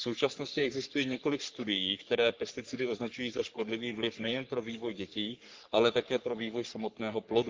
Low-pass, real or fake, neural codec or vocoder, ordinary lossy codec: 7.2 kHz; fake; codec, 44.1 kHz, 3.4 kbps, Pupu-Codec; Opus, 32 kbps